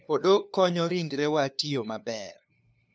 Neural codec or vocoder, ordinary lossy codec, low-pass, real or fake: codec, 16 kHz, 2 kbps, FunCodec, trained on LibriTTS, 25 frames a second; none; none; fake